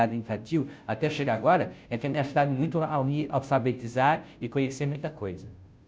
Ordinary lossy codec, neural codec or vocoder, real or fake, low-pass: none; codec, 16 kHz, 0.5 kbps, FunCodec, trained on Chinese and English, 25 frames a second; fake; none